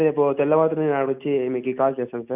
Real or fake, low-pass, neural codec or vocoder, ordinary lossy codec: real; 3.6 kHz; none; none